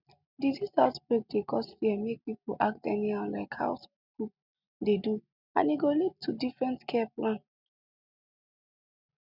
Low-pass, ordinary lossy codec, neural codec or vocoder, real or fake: 5.4 kHz; none; none; real